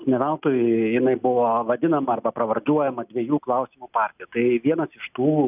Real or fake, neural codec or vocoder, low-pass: real; none; 3.6 kHz